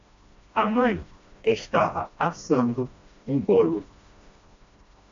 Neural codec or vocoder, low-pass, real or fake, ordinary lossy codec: codec, 16 kHz, 1 kbps, FreqCodec, smaller model; 7.2 kHz; fake; AAC, 64 kbps